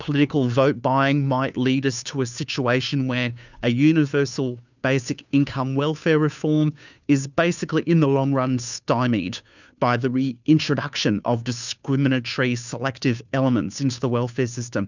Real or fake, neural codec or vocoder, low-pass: fake; codec, 16 kHz, 2 kbps, FunCodec, trained on Chinese and English, 25 frames a second; 7.2 kHz